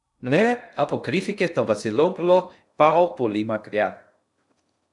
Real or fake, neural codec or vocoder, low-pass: fake; codec, 16 kHz in and 24 kHz out, 0.6 kbps, FocalCodec, streaming, 2048 codes; 10.8 kHz